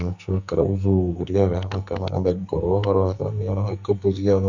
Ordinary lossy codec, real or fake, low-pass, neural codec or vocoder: none; fake; 7.2 kHz; codec, 44.1 kHz, 2.6 kbps, SNAC